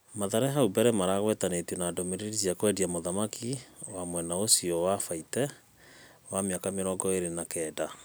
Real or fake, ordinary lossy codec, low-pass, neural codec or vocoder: real; none; none; none